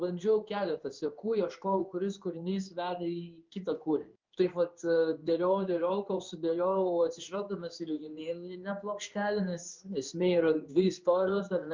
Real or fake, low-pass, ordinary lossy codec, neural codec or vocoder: fake; 7.2 kHz; Opus, 24 kbps; codec, 16 kHz in and 24 kHz out, 1 kbps, XY-Tokenizer